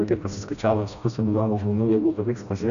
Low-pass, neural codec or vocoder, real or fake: 7.2 kHz; codec, 16 kHz, 1 kbps, FreqCodec, smaller model; fake